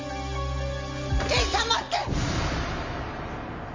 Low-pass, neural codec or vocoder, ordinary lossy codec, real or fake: 7.2 kHz; none; MP3, 48 kbps; real